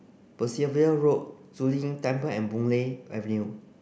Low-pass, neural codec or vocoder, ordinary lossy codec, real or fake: none; none; none; real